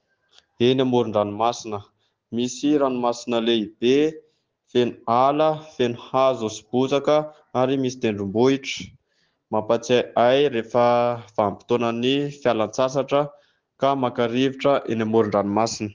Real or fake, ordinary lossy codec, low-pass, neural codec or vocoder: real; Opus, 16 kbps; 7.2 kHz; none